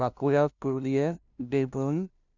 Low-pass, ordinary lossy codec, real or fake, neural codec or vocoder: 7.2 kHz; none; fake; codec, 16 kHz, 0.5 kbps, FunCodec, trained on LibriTTS, 25 frames a second